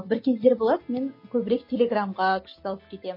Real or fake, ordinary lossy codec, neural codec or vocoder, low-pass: real; MP3, 24 kbps; none; 5.4 kHz